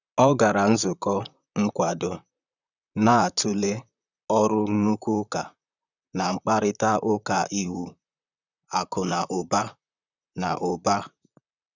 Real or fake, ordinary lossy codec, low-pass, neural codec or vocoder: fake; none; 7.2 kHz; vocoder, 44.1 kHz, 128 mel bands, Pupu-Vocoder